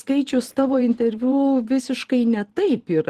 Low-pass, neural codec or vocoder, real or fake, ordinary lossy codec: 14.4 kHz; vocoder, 44.1 kHz, 128 mel bands every 512 samples, BigVGAN v2; fake; Opus, 16 kbps